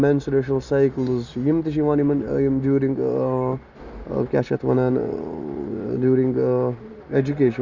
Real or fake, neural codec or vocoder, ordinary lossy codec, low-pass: real; none; Opus, 64 kbps; 7.2 kHz